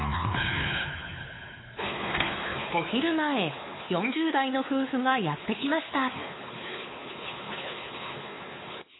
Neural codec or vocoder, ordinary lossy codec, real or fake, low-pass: codec, 16 kHz, 4 kbps, X-Codec, WavLM features, trained on Multilingual LibriSpeech; AAC, 16 kbps; fake; 7.2 kHz